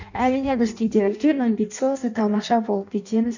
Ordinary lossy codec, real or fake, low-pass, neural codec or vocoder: none; fake; 7.2 kHz; codec, 16 kHz in and 24 kHz out, 0.6 kbps, FireRedTTS-2 codec